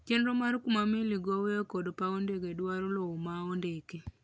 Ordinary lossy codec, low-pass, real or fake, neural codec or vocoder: none; none; real; none